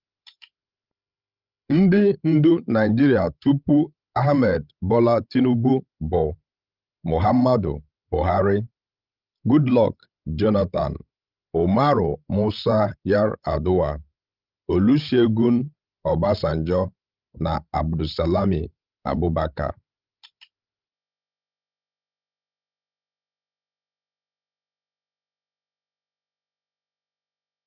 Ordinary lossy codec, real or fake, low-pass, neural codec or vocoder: Opus, 24 kbps; fake; 5.4 kHz; codec, 16 kHz, 16 kbps, FreqCodec, larger model